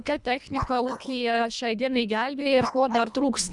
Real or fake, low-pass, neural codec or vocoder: fake; 10.8 kHz; codec, 24 kHz, 1.5 kbps, HILCodec